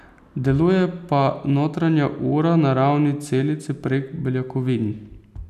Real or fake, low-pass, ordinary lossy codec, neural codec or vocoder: real; 14.4 kHz; none; none